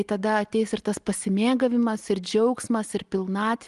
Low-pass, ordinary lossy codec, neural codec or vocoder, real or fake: 10.8 kHz; Opus, 24 kbps; none; real